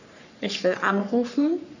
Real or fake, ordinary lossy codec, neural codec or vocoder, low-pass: fake; none; codec, 44.1 kHz, 3.4 kbps, Pupu-Codec; 7.2 kHz